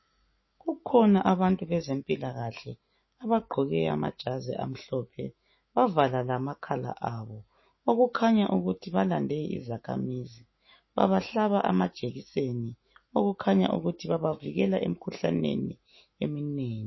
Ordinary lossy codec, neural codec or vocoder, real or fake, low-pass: MP3, 24 kbps; autoencoder, 48 kHz, 128 numbers a frame, DAC-VAE, trained on Japanese speech; fake; 7.2 kHz